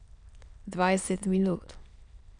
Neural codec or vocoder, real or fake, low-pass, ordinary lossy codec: autoencoder, 22.05 kHz, a latent of 192 numbers a frame, VITS, trained on many speakers; fake; 9.9 kHz; none